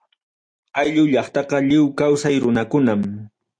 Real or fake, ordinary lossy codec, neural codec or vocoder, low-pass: real; MP3, 64 kbps; none; 9.9 kHz